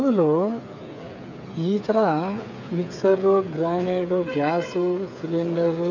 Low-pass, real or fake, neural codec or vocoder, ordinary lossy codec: 7.2 kHz; fake; codec, 16 kHz, 8 kbps, FreqCodec, smaller model; none